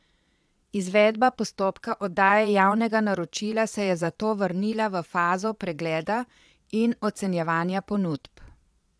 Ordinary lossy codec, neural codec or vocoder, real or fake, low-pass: none; vocoder, 22.05 kHz, 80 mel bands, Vocos; fake; none